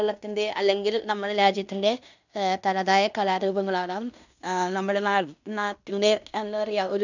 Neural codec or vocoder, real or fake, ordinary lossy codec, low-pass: codec, 16 kHz in and 24 kHz out, 0.9 kbps, LongCat-Audio-Codec, fine tuned four codebook decoder; fake; none; 7.2 kHz